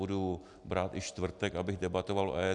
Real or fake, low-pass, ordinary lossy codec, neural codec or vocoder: real; 10.8 kHz; AAC, 64 kbps; none